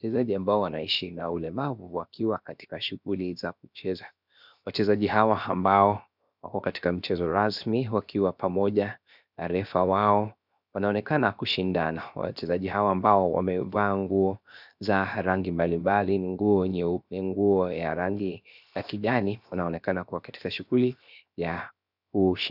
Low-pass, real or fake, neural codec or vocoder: 5.4 kHz; fake; codec, 16 kHz, 0.7 kbps, FocalCodec